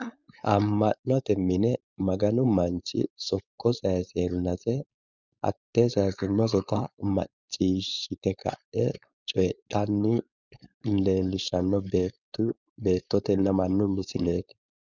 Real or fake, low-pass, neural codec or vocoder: fake; 7.2 kHz; codec, 16 kHz, 4.8 kbps, FACodec